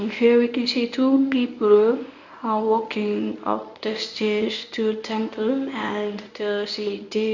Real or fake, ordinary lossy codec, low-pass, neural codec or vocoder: fake; none; 7.2 kHz; codec, 24 kHz, 0.9 kbps, WavTokenizer, medium speech release version 1